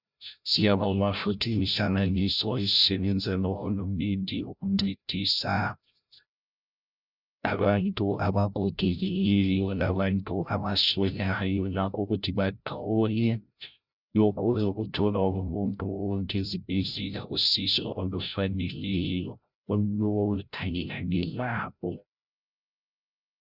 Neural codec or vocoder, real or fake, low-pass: codec, 16 kHz, 0.5 kbps, FreqCodec, larger model; fake; 5.4 kHz